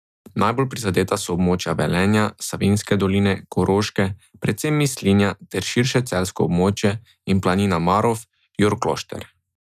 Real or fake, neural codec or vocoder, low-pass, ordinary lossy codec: real; none; 14.4 kHz; none